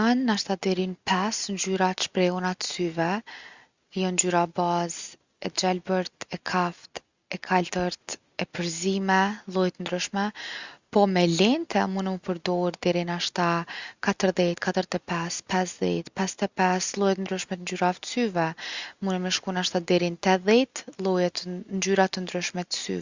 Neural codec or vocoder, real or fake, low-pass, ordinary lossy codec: none; real; 7.2 kHz; Opus, 64 kbps